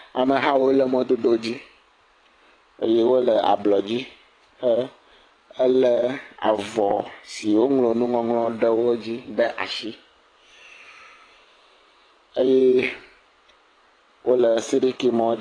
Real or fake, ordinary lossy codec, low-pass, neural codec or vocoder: fake; AAC, 32 kbps; 9.9 kHz; vocoder, 22.05 kHz, 80 mel bands, WaveNeXt